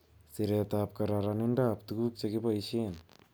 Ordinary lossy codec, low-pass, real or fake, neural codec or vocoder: none; none; real; none